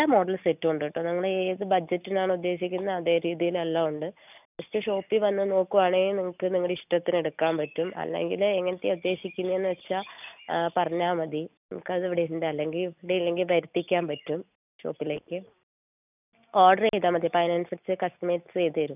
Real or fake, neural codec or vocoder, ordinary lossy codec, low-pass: real; none; none; 3.6 kHz